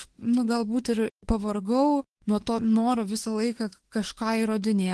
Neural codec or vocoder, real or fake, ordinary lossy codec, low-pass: autoencoder, 48 kHz, 32 numbers a frame, DAC-VAE, trained on Japanese speech; fake; Opus, 16 kbps; 10.8 kHz